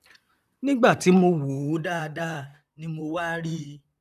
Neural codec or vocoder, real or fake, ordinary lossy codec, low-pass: vocoder, 44.1 kHz, 128 mel bands, Pupu-Vocoder; fake; none; 14.4 kHz